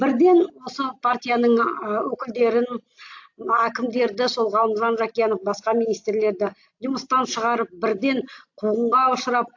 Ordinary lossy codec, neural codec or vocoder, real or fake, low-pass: AAC, 48 kbps; none; real; 7.2 kHz